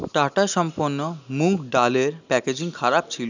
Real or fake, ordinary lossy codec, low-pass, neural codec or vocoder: real; none; 7.2 kHz; none